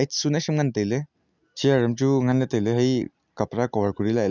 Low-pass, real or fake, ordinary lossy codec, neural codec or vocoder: 7.2 kHz; real; none; none